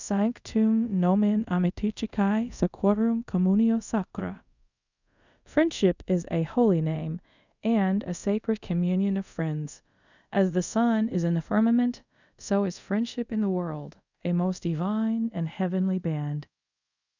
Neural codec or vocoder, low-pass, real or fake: codec, 24 kHz, 0.5 kbps, DualCodec; 7.2 kHz; fake